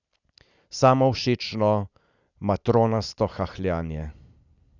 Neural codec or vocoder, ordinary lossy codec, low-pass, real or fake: none; none; 7.2 kHz; real